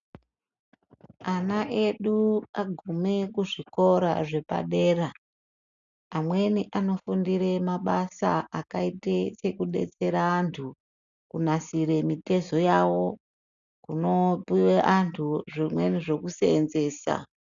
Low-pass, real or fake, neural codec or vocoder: 7.2 kHz; real; none